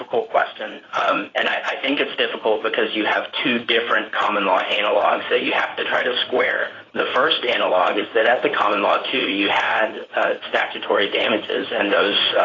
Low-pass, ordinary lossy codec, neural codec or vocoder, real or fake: 7.2 kHz; AAC, 32 kbps; codec, 16 kHz in and 24 kHz out, 2.2 kbps, FireRedTTS-2 codec; fake